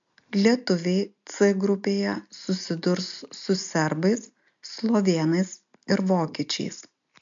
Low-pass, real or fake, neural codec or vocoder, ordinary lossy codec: 7.2 kHz; real; none; MP3, 64 kbps